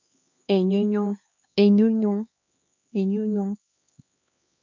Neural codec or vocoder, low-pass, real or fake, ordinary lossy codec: codec, 16 kHz, 2 kbps, X-Codec, HuBERT features, trained on LibriSpeech; 7.2 kHz; fake; MP3, 48 kbps